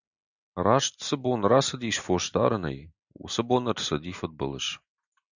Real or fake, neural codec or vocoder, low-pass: real; none; 7.2 kHz